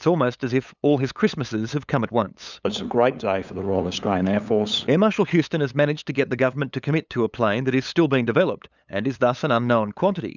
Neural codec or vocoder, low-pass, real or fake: codec, 16 kHz, 8 kbps, FunCodec, trained on LibriTTS, 25 frames a second; 7.2 kHz; fake